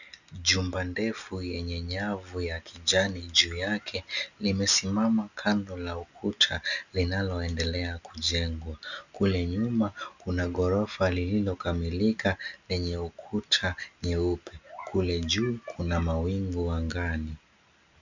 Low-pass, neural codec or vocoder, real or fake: 7.2 kHz; none; real